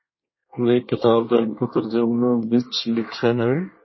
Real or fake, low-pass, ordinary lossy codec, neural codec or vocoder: fake; 7.2 kHz; MP3, 24 kbps; codec, 24 kHz, 1 kbps, SNAC